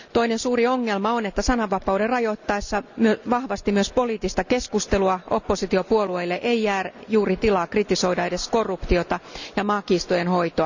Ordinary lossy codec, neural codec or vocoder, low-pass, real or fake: none; none; 7.2 kHz; real